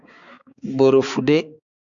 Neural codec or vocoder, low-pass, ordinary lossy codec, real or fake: codec, 16 kHz, 4 kbps, X-Codec, HuBERT features, trained on balanced general audio; 7.2 kHz; Opus, 64 kbps; fake